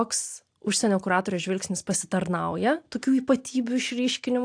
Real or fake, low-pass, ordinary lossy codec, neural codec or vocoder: real; 9.9 kHz; AAC, 64 kbps; none